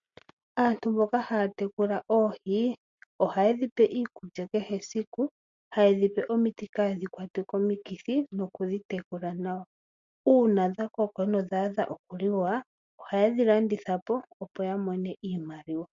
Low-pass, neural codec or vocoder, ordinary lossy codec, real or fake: 7.2 kHz; none; MP3, 48 kbps; real